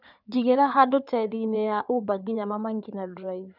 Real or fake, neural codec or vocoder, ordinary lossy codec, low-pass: fake; codec, 16 kHz in and 24 kHz out, 2.2 kbps, FireRedTTS-2 codec; none; 5.4 kHz